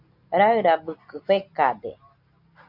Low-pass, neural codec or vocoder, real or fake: 5.4 kHz; none; real